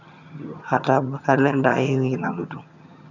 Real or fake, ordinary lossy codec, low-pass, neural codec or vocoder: fake; none; 7.2 kHz; vocoder, 22.05 kHz, 80 mel bands, HiFi-GAN